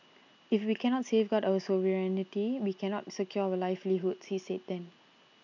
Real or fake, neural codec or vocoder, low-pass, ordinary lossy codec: real; none; 7.2 kHz; none